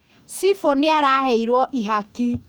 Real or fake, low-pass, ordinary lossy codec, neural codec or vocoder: fake; none; none; codec, 44.1 kHz, 2.6 kbps, DAC